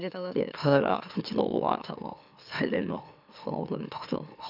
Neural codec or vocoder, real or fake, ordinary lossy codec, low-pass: autoencoder, 44.1 kHz, a latent of 192 numbers a frame, MeloTTS; fake; none; 5.4 kHz